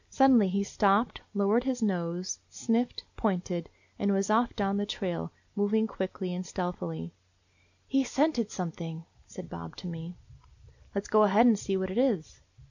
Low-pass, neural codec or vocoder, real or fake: 7.2 kHz; none; real